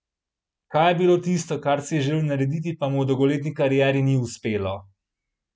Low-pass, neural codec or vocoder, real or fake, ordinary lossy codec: none; none; real; none